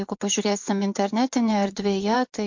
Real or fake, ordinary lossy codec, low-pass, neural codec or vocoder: fake; MP3, 48 kbps; 7.2 kHz; vocoder, 24 kHz, 100 mel bands, Vocos